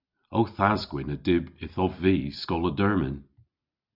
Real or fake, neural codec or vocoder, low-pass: real; none; 5.4 kHz